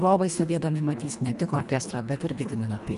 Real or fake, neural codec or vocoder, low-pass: fake; codec, 24 kHz, 1.5 kbps, HILCodec; 10.8 kHz